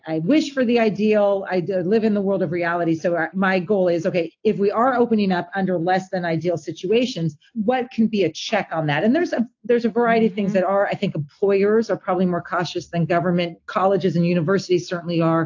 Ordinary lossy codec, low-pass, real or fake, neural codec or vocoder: AAC, 48 kbps; 7.2 kHz; real; none